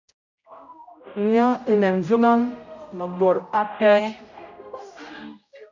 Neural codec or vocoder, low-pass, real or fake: codec, 16 kHz, 0.5 kbps, X-Codec, HuBERT features, trained on general audio; 7.2 kHz; fake